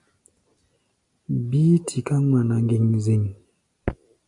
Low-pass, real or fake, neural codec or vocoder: 10.8 kHz; real; none